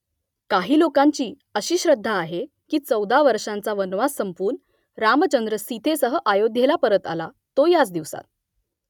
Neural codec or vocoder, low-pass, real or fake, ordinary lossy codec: none; 19.8 kHz; real; none